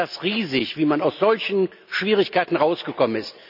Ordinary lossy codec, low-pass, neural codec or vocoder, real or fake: none; 5.4 kHz; none; real